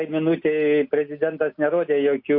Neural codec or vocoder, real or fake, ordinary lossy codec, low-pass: none; real; MP3, 48 kbps; 5.4 kHz